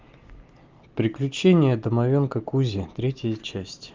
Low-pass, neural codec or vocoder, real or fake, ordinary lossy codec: 7.2 kHz; none; real; Opus, 32 kbps